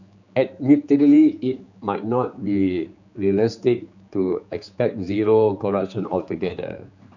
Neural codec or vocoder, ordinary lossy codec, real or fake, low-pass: codec, 16 kHz, 4 kbps, X-Codec, HuBERT features, trained on general audio; none; fake; 7.2 kHz